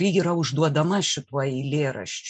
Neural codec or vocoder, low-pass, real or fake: none; 9.9 kHz; real